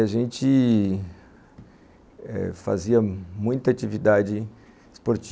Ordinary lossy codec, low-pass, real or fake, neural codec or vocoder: none; none; real; none